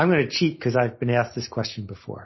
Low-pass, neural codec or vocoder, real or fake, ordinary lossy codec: 7.2 kHz; none; real; MP3, 24 kbps